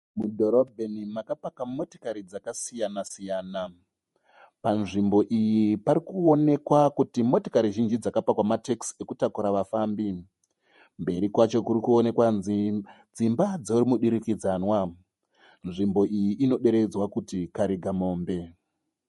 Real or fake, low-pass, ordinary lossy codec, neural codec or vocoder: fake; 19.8 kHz; MP3, 48 kbps; vocoder, 48 kHz, 128 mel bands, Vocos